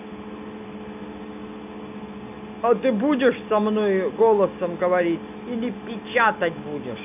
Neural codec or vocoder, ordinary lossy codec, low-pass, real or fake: none; none; 3.6 kHz; real